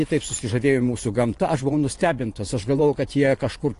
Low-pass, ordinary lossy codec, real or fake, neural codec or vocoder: 10.8 kHz; AAC, 48 kbps; fake; vocoder, 24 kHz, 100 mel bands, Vocos